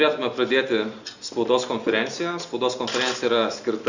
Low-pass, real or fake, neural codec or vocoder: 7.2 kHz; real; none